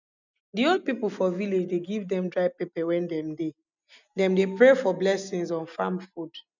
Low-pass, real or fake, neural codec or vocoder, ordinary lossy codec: 7.2 kHz; real; none; none